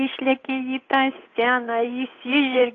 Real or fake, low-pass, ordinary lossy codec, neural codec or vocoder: fake; 7.2 kHz; AAC, 32 kbps; codec, 16 kHz, 8 kbps, FunCodec, trained on Chinese and English, 25 frames a second